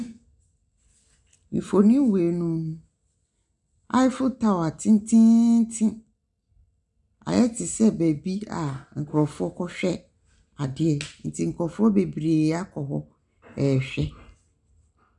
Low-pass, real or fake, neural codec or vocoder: 10.8 kHz; real; none